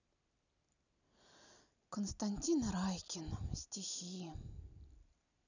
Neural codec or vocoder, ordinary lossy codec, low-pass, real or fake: none; none; 7.2 kHz; real